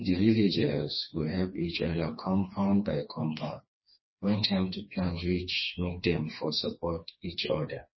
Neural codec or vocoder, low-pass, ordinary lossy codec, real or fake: codec, 16 kHz, 2 kbps, FreqCodec, smaller model; 7.2 kHz; MP3, 24 kbps; fake